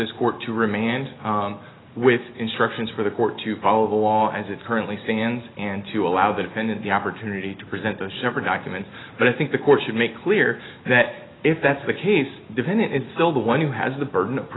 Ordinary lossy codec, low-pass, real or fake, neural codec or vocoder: AAC, 16 kbps; 7.2 kHz; real; none